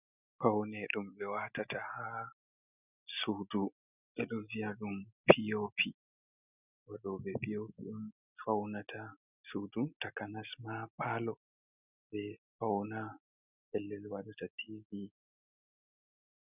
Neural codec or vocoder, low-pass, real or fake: none; 3.6 kHz; real